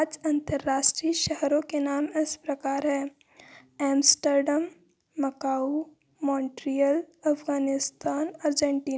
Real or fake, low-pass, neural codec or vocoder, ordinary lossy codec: real; none; none; none